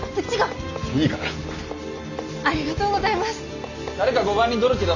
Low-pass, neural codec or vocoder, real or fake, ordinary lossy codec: 7.2 kHz; none; real; none